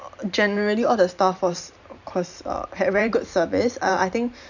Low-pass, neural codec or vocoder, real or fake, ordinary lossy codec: 7.2 kHz; vocoder, 44.1 kHz, 128 mel bands every 512 samples, BigVGAN v2; fake; none